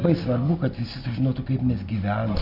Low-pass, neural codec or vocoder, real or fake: 5.4 kHz; none; real